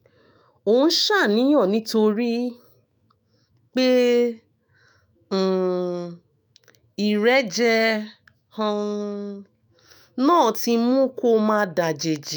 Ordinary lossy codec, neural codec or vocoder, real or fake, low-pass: none; autoencoder, 48 kHz, 128 numbers a frame, DAC-VAE, trained on Japanese speech; fake; none